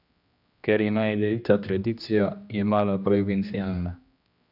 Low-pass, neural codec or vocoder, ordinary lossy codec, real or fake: 5.4 kHz; codec, 16 kHz, 1 kbps, X-Codec, HuBERT features, trained on general audio; none; fake